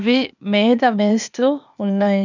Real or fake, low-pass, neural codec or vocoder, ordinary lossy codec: fake; 7.2 kHz; codec, 16 kHz, 0.8 kbps, ZipCodec; none